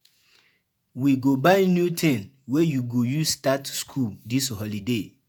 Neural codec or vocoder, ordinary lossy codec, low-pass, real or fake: vocoder, 48 kHz, 128 mel bands, Vocos; none; none; fake